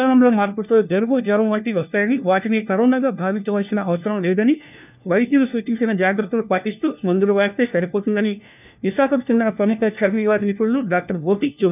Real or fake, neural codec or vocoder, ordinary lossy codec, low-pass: fake; codec, 16 kHz, 1 kbps, FunCodec, trained on LibriTTS, 50 frames a second; none; 3.6 kHz